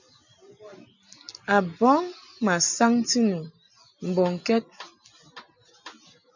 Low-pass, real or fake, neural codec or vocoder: 7.2 kHz; real; none